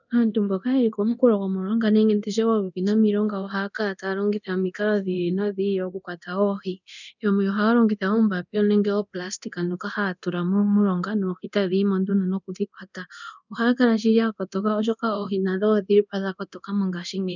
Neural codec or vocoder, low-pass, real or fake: codec, 24 kHz, 0.9 kbps, DualCodec; 7.2 kHz; fake